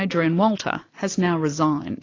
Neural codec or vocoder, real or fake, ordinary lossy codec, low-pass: none; real; AAC, 32 kbps; 7.2 kHz